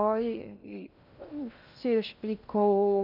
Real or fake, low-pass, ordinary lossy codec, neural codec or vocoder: fake; 5.4 kHz; none; codec, 16 kHz in and 24 kHz out, 0.6 kbps, FocalCodec, streaming, 2048 codes